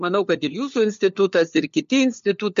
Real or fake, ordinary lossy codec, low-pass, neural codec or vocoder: fake; MP3, 48 kbps; 7.2 kHz; codec, 16 kHz, 4 kbps, FunCodec, trained on LibriTTS, 50 frames a second